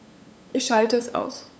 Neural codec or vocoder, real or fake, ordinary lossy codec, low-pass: codec, 16 kHz, 8 kbps, FunCodec, trained on LibriTTS, 25 frames a second; fake; none; none